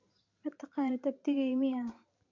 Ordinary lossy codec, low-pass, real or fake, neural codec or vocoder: MP3, 48 kbps; 7.2 kHz; fake; vocoder, 44.1 kHz, 128 mel bands every 512 samples, BigVGAN v2